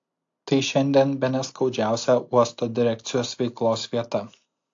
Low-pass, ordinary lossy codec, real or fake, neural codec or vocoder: 7.2 kHz; AAC, 48 kbps; real; none